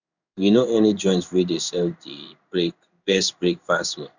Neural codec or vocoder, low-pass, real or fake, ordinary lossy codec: codec, 16 kHz in and 24 kHz out, 1 kbps, XY-Tokenizer; 7.2 kHz; fake; none